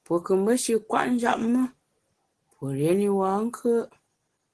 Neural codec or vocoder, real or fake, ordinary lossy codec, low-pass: none; real; Opus, 16 kbps; 10.8 kHz